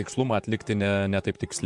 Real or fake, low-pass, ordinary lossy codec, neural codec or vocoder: fake; 10.8 kHz; MP3, 64 kbps; vocoder, 44.1 kHz, 128 mel bands, Pupu-Vocoder